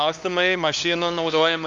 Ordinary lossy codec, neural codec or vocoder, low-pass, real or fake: Opus, 32 kbps; codec, 16 kHz, 2 kbps, X-Codec, HuBERT features, trained on LibriSpeech; 7.2 kHz; fake